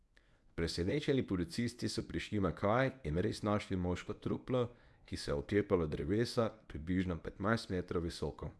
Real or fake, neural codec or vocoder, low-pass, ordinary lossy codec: fake; codec, 24 kHz, 0.9 kbps, WavTokenizer, medium speech release version 1; none; none